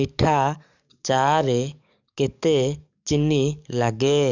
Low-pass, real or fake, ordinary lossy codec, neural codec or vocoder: 7.2 kHz; fake; none; codec, 44.1 kHz, 7.8 kbps, DAC